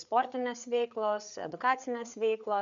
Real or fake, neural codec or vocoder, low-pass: fake; codec, 16 kHz, 4 kbps, FreqCodec, larger model; 7.2 kHz